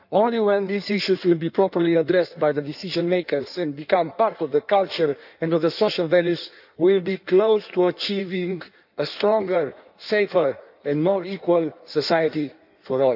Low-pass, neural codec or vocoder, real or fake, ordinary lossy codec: 5.4 kHz; codec, 16 kHz in and 24 kHz out, 1.1 kbps, FireRedTTS-2 codec; fake; none